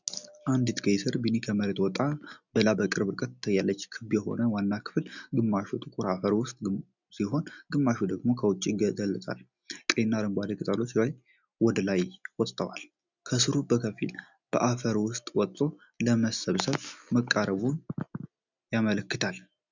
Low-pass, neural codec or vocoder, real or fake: 7.2 kHz; none; real